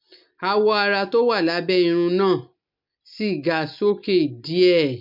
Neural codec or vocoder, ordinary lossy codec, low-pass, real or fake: none; MP3, 48 kbps; 5.4 kHz; real